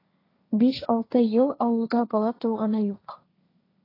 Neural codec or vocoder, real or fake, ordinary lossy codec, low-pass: codec, 16 kHz, 1.1 kbps, Voila-Tokenizer; fake; AAC, 24 kbps; 5.4 kHz